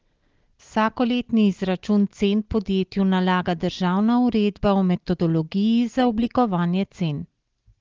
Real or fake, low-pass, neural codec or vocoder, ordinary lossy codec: real; 7.2 kHz; none; Opus, 16 kbps